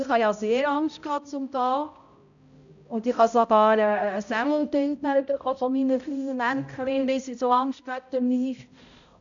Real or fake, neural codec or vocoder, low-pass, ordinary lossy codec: fake; codec, 16 kHz, 0.5 kbps, X-Codec, HuBERT features, trained on balanced general audio; 7.2 kHz; none